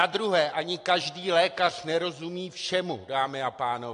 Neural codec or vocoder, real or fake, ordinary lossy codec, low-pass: none; real; AAC, 48 kbps; 9.9 kHz